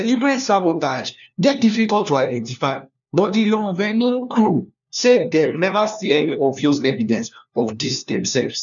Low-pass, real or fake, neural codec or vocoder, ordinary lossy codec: 7.2 kHz; fake; codec, 16 kHz, 1 kbps, FunCodec, trained on LibriTTS, 50 frames a second; none